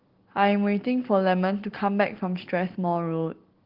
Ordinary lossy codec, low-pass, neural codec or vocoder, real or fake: Opus, 16 kbps; 5.4 kHz; none; real